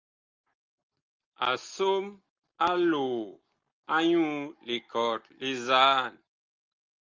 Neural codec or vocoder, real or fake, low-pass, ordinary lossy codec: none; real; 7.2 kHz; Opus, 24 kbps